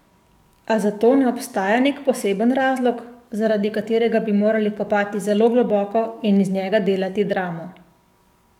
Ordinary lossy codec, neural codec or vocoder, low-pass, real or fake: none; codec, 44.1 kHz, 7.8 kbps, DAC; 19.8 kHz; fake